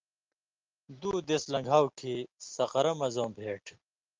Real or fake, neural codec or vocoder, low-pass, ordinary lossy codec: real; none; 7.2 kHz; Opus, 32 kbps